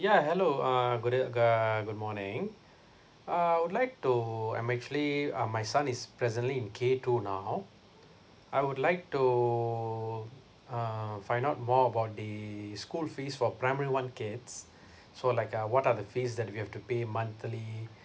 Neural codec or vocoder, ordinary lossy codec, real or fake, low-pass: none; none; real; none